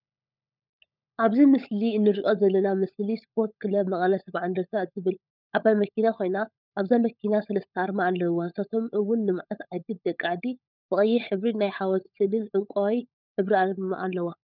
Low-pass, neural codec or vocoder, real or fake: 5.4 kHz; codec, 16 kHz, 16 kbps, FunCodec, trained on LibriTTS, 50 frames a second; fake